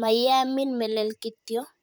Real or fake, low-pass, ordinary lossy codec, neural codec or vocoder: fake; none; none; vocoder, 44.1 kHz, 128 mel bands, Pupu-Vocoder